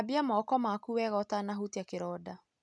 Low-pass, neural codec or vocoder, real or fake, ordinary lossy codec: none; none; real; none